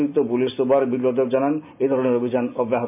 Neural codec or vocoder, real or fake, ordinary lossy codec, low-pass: none; real; MP3, 32 kbps; 3.6 kHz